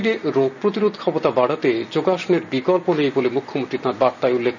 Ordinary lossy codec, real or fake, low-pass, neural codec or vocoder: none; real; 7.2 kHz; none